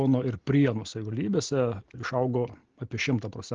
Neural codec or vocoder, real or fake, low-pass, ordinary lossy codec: none; real; 7.2 kHz; Opus, 16 kbps